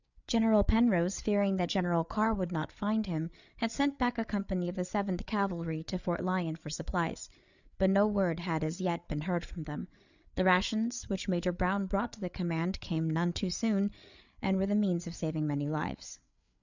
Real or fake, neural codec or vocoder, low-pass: fake; codec, 16 kHz, 8 kbps, FreqCodec, larger model; 7.2 kHz